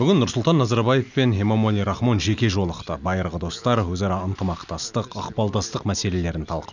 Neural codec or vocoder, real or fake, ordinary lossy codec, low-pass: none; real; none; 7.2 kHz